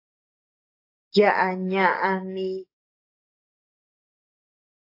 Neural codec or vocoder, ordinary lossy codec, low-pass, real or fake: codec, 44.1 kHz, 7.8 kbps, DAC; AAC, 32 kbps; 5.4 kHz; fake